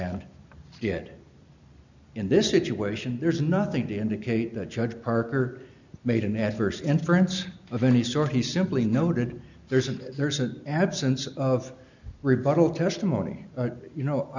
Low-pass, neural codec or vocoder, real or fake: 7.2 kHz; none; real